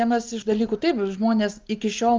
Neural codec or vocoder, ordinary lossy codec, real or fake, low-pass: none; Opus, 24 kbps; real; 7.2 kHz